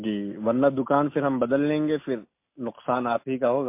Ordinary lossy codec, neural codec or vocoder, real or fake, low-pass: MP3, 24 kbps; none; real; 3.6 kHz